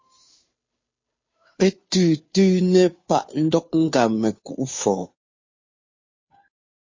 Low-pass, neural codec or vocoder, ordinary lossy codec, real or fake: 7.2 kHz; codec, 16 kHz, 2 kbps, FunCodec, trained on Chinese and English, 25 frames a second; MP3, 32 kbps; fake